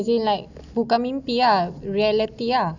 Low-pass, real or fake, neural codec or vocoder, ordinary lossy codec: 7.2 kHz; real; none; none